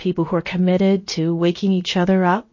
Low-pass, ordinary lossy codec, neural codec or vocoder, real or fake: 7.2 kHz; MP3, 32 kbps; codec, 16 kHz, about 1 kbps, DyCAST, with the encoder's durations; fake